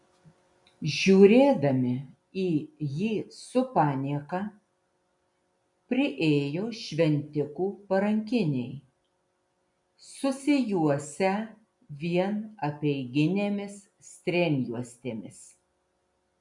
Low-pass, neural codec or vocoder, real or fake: 10.8 kHz; none; real